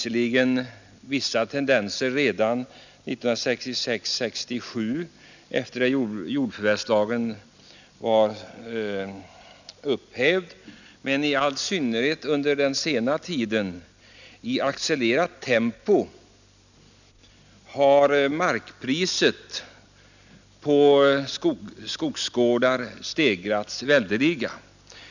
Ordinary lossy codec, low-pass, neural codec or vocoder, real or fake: none; 7.2 kHz; none; real